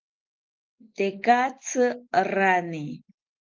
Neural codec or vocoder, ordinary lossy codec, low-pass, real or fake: none; Opus, 24 kbps; 7.2 kHz; real